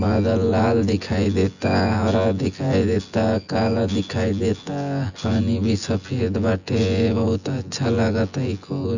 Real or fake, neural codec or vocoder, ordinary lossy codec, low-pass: fake; vocoder, 24 kHz, 100 mel bands, Vocos; none; 7.2 kHz